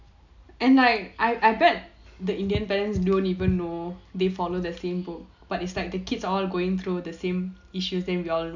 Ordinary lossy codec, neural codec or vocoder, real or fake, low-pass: none; none; real; 7.2 kHz